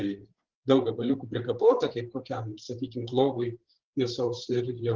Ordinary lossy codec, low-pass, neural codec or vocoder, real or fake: Opus, 16 kbps; 7.2 kHz; codec, 16 kHz, 8 kbps, FreqCodec, larger model; fake